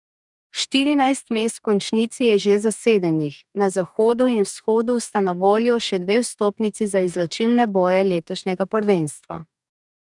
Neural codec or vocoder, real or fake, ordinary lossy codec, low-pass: codec, 44.1 kHz, 2.6 kbps, DAC; fake; none; 10.8 kHz